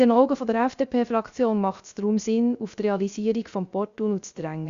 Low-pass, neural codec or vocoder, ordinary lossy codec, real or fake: 7.2 kHz; codec, 16 kHz, 0.3 kbps, FocalCodec; Opus, 64 kbps; fake